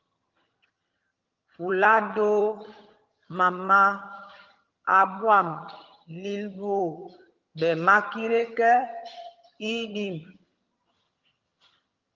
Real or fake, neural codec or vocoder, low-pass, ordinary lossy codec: fake; vocoder, 22.05 kHz, 80 mel bands, HiFi-GAN; 7.2 kHz; Opus, 32 kbps